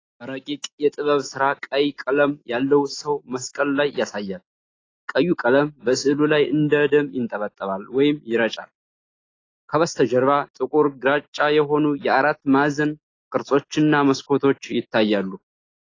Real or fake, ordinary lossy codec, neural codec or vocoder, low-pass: real; AAC, 32 kbps; none; 7.2 kHz